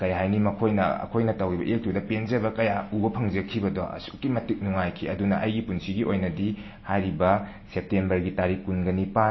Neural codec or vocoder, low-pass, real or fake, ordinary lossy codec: none; 7.2 kHz; real; MP3, 24 kbps